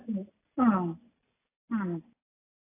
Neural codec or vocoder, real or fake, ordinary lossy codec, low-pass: none; real; AAC, 16 kbps; 3.6 kHz